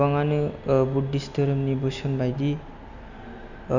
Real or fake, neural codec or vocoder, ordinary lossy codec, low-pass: real; none; none; 7.2 kHz